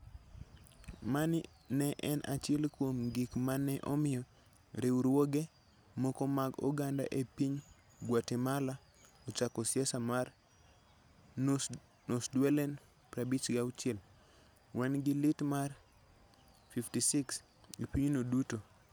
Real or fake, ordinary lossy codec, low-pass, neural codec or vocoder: real; none; none; none